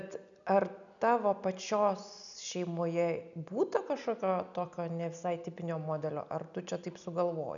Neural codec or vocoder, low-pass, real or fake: none; 7.2 kHz; real